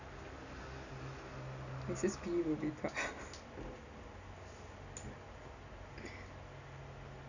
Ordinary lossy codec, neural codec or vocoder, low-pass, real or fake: none; none; 7.2 kHz; real